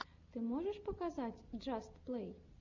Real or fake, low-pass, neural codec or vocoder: real; 7.2 kHz; none